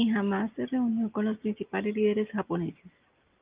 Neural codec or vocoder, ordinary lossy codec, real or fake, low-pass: none; Opus, 24 kbps; real; 3.6 kHz